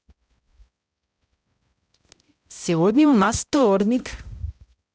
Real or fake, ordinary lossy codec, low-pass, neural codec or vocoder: fake; none; none; codec, 16 kHz, 0.5 kbps, X-Codec, HuBERT features, trained on balanced general audio